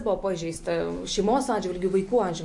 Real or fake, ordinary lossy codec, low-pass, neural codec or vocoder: real; MP3, 48 kbps; 10.8 kHz; none